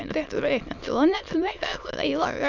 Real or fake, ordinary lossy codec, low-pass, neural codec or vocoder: fake; none; 7.2 kHz; autoencoder, 22.05 kHz, a latent of 192 numbers a frame, VITS, trained on many speakers